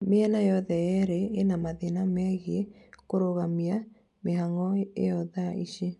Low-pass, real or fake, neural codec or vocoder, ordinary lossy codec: 10.8 kHz; real; none; none